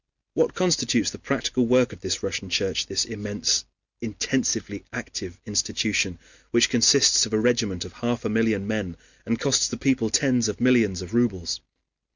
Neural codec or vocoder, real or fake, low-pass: none; real; 7.2 kHz